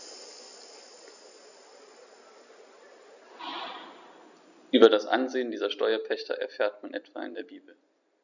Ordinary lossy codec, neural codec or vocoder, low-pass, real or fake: none; none; 7.2 kHz; real